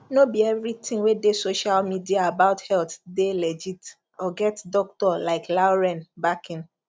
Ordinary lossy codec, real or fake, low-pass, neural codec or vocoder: none; real; none; none